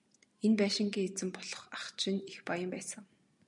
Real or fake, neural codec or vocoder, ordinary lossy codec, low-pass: real; none; MP3, 96 kbps; 10.8 kHz